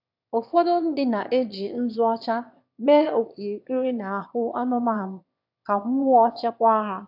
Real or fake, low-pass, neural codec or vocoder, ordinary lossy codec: fake; 5.4 kHz; autoencoder, 22.05 kHz, a latent of 192 numbers a frame, VITS, trained on one speaker; AAC, 48 kbps